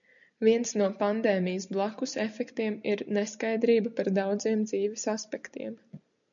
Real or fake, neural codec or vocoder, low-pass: real; none; 7.2 kHz